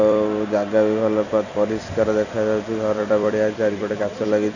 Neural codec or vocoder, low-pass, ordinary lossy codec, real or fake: vocoder, 44.1 kHz, 128 mel bands every 256 samples, BigVGAN v2; 7.2 kHz; none; fake